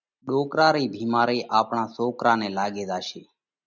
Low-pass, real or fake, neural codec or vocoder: 7.2 kHz; real; none